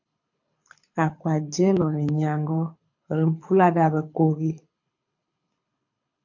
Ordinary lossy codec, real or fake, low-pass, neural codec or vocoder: MP3, 48 kbps; fake; 7.2 kHz; codec, 24 kHz, 6 kbps, HILCodec